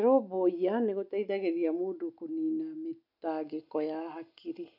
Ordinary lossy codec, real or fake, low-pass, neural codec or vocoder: none; real; 5.4 kHz; none